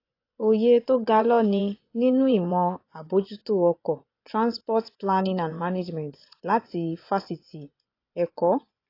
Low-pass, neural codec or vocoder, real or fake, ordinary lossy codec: 5.4 kHz; vocoder, 24 kHz, 100 mel bands, Vocos; fake; AAC, 32 kbps